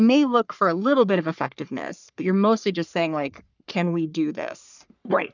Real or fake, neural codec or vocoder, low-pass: fake; codec, 44.1 kHz, 3.4 kbps, Pupu-Codec; 7.2 kHz